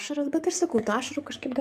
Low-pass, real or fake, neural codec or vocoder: 14.4 kHz; fake; codec, 44.1 kHz, 7.8 kbps, DAC